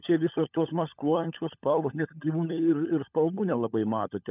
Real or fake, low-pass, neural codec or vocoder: fake; 3.6 kHz; codec, 16 kHz, 16 kbps, FunCodec, trained on LibriTTS, 50 frames a second